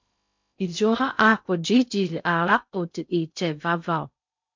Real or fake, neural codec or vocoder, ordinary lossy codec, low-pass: fake; codec, 16 kHz in and 24 kHz out, 0.6 kbps, FocalCodec, streaming, 2048 codes; MP3, 64 kbps; 7.2 kHz